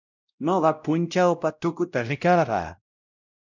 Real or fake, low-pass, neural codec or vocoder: fake; 7.2 kHz; codec, 16 kHz, 0.5 kbps, X-Codec, WavLM features, trained on Multilingual LibriSpeech